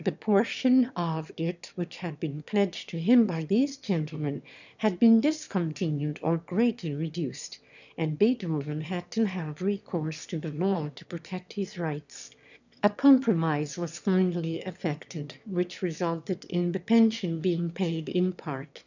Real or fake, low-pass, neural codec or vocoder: fake; 7.2 kHz; autoencoder, 22.05 kHz, a latent of 192 numbers a frame, VITS, trained on one speaker